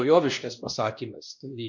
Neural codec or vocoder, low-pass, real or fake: codec, 16 kHz, 1 kbps, X-Codec, WavLM features, trained on Multilingual LibriSpeech; 7.2 kHz; fake